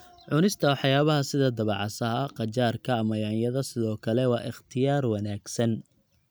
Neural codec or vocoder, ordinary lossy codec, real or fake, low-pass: none; none; real; none